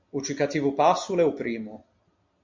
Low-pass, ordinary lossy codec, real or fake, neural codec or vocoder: 7.2 kHz; MP3, 48 kbps; real; none